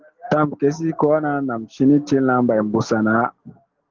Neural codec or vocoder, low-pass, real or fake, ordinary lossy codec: none; 7.2 kHz; real; Opus, 16 kbps